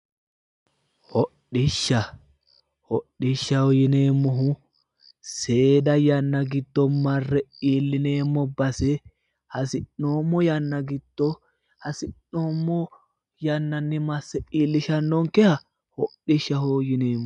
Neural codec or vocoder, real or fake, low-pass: none; real; 10.8 kHz